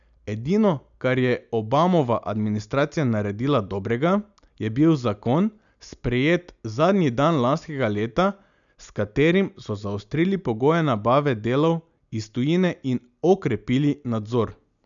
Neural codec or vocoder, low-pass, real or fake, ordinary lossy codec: none; 7.2 kHz; real; none